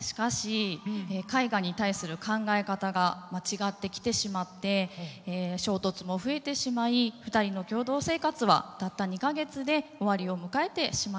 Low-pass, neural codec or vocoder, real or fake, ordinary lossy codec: none; none; real; none